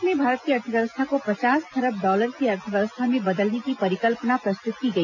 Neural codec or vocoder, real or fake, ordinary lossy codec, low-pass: none; real; none; 7.2 kHz